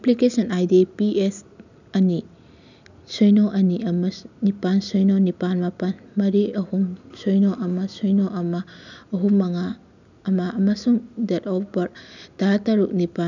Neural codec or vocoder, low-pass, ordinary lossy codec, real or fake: none; 7.2 kHz; none; real